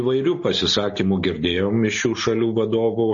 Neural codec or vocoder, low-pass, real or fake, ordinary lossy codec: none; 7.2 kHz; real; MP3, 32 kbps